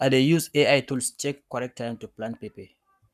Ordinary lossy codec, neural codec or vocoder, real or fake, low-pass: none; codec, 44.1 kHz, 7.8 kbps, Pupu-Codec; fake; 14.4 kHz